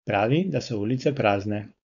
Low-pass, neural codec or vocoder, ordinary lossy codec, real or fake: 7.2 kHz; codec, 16 kHz, 4.8 kbps, FACodec; none; fake